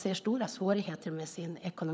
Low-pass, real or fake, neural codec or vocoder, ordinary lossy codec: none; fake; codec, 16 kHz, 16 kbps, FunCodec, trained on LibriTTS, 50 frames a second; none